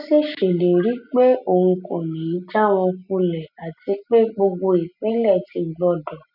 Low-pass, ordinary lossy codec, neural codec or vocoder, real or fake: 5.4 kHz; none; none; real